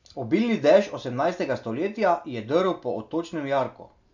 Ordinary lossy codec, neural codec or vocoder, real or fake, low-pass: none; none; real; 7.2 kHz